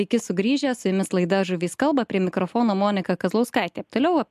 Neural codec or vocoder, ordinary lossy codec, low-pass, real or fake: none; MP3, 96 kbps; 14.4 kHz; real